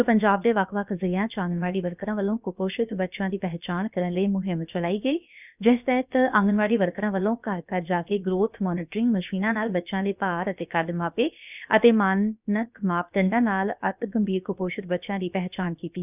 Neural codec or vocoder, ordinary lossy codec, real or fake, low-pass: codec, 16 kHz, about 1 kbps, DyCAST, with the encoder's durations; none; fake; 3.6 kHz